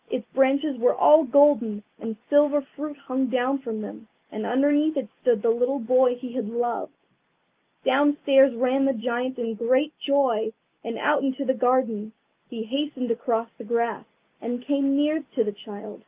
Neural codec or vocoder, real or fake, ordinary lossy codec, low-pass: none; real; Opus, 24 kbps; 3.6 kHz